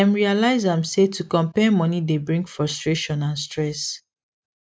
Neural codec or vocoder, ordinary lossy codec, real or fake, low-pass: none; none; real; none